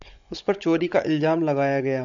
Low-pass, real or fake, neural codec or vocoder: 7.2 kHz; fake; codec, 16 kHz, 16 kbps, FunCodec, trained on Chinese and English, 50 frames a second